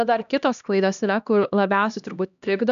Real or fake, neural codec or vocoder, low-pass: fake; codec, 16 kHz, 1 kbps, X-Codec, HuBERT features, trained on LibriSpeech; 7.2 kHz